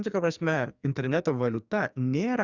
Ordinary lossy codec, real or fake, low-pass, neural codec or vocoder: Opus, 64 kbps; fake; 7.2 kHz; codec, 44.1 kHz, 2.6 kbps, SNAC